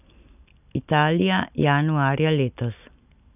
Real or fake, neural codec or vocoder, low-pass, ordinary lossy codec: real; none; 3.6 kHz; none